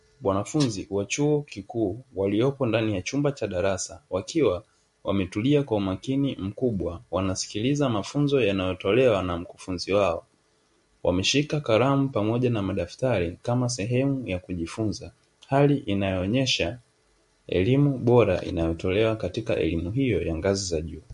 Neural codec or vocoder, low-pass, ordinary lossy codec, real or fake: none; 14.4 kHz; MP3, 48 kbps; real